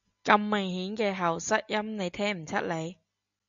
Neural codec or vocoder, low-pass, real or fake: none; 7.2 kHz; real